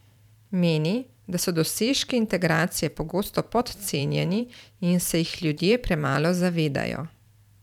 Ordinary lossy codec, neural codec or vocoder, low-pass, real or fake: none; vocoder, 44.1 kHz, 128 mel bands every 512 samples, BigVGAN v2; 19.8 kHz; fake